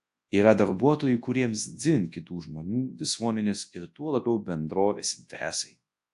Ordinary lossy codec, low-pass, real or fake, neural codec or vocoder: AAC, 64 kbps; 10.8 kHz; fake; codec, 24 kHz, 0.9 kbps, WavTokenizer, large speech release